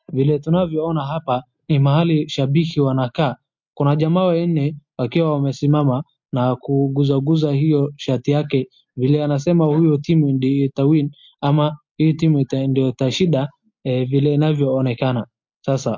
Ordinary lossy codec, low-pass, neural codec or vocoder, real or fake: MP3, 48 kbps; 7.2 kHz; none; real